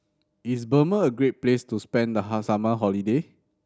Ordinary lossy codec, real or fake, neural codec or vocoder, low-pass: none; real; none; none